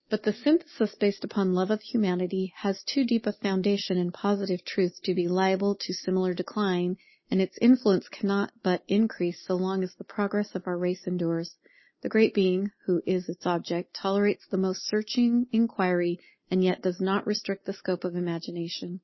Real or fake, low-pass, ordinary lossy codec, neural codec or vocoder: real; 7.2 kHz; MP3, 24 kbps; none